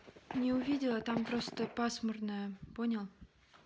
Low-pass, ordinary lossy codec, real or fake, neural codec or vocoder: none; none; real; none